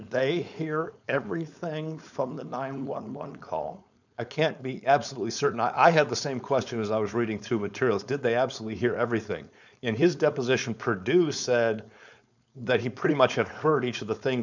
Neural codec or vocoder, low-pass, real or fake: codec, 16 kHz, 4.8 kbps, FACodec; 7.2 kHz; fake